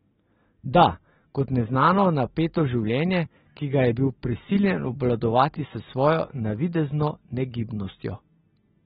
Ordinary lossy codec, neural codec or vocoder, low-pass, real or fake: AAC, 16 kbps; none; 19.8 kHz; real